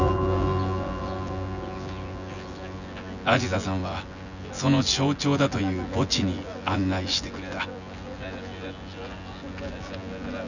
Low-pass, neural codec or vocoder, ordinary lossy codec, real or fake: 7.2 kHz; vocoder, 24 kHz, 100 mel bands, Vocos; none; fake